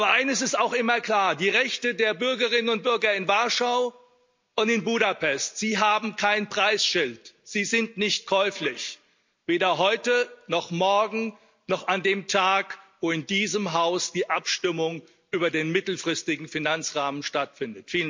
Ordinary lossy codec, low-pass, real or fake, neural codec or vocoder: MP3, 64 kbps; 7.2 kHz; real; none